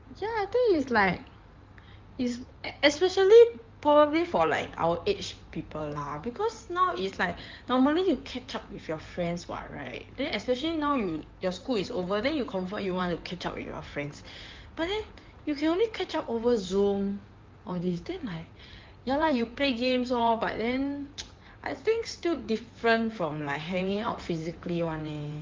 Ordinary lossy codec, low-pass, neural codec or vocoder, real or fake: Opus, 24 kbps; 7.2 kHz; codec, 16 kHz in and 24 kHz out, 2.2 kbps, FireRedTTS-2 codec; fake